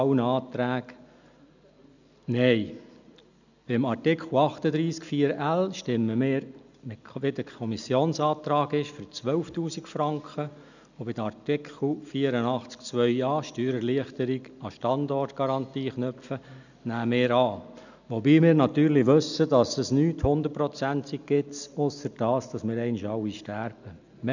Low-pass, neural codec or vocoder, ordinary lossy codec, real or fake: 7.2 kHz; none; none; real